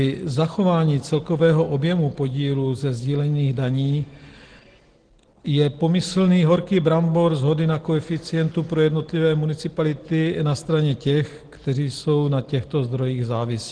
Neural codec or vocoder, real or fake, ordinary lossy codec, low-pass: none; real; Opus, 16 kbps; 9.9 kHz